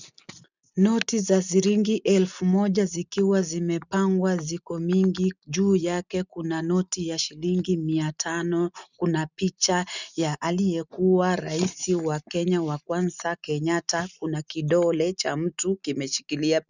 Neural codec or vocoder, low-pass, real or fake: none; 7.2 kHz; real